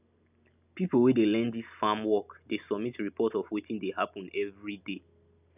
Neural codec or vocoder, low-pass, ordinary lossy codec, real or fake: none; 3.6 kHz; none; real